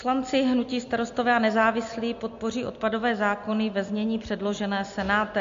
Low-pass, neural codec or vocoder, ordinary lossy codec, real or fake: 7.2 kHz; none; MP3, 48 kbps; real